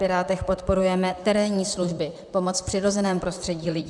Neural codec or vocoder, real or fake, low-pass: vocoder, 44.1 kHz, 128 mel bands, Pupu-Vocoder; fake; 10.8 kHz